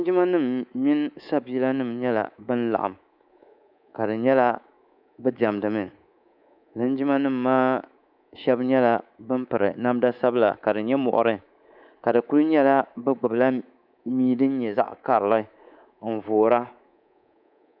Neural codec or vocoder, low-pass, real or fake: codec, 24 kHz, 3.1 kbps, DualCodec; 5.4 kHz; fake